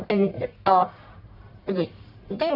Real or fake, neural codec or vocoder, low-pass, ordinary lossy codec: fake; codec, 44.1 kHz, 1.7 kbps, Pupu-Codec; 5.4 kHz; none